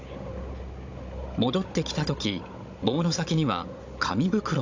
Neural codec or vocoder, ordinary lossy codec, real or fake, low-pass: codec, 16 kHz, 16 kbps, FunCodec, trained on Chinese and English, 50 frames a second; AAC, 48 kbps; fake; 7.2 kHz